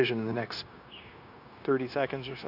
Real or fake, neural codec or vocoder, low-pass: fake; codec, 16 kHz, 0.9 kbps, LongCat-Audio-Codec; 5.4 kHz